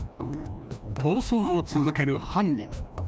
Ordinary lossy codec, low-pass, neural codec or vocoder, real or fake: none; none; codec, 16 kHz, 1 kbps, FreqCodec, larger model; fake